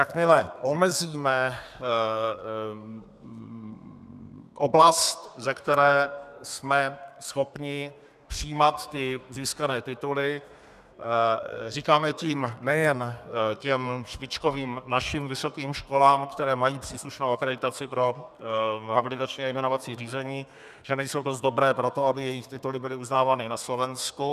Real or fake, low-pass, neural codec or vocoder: fake; 14.4 kHz; codec, 32 kHz, 1.9 kbps, SNAC